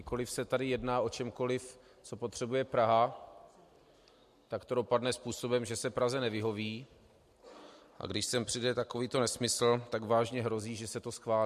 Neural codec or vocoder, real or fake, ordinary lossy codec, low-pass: none; real; MP3, 64 kbps; 14.4 kHz